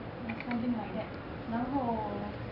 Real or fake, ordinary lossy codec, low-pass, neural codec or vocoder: real; none; 5.4 kHz; none